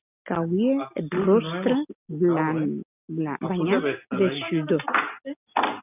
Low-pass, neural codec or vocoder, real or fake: 3.6 kHz; none; real